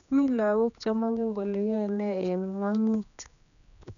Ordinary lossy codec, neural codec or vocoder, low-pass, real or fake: none; codec, 16 kHz, 2 kbps, X-Codec, HuBERT features, trained on general audio; 7.2 kHz; fake